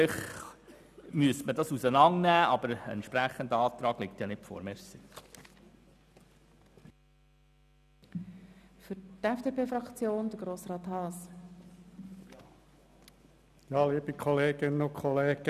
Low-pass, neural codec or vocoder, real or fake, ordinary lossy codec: 14.4 kHz; none; real; none